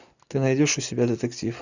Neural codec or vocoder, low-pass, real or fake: none; 7.2 kHz; real